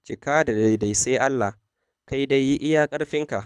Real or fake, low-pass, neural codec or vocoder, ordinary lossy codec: fake; none; codec, 24 kHz, 6 kbps, HILCodec; none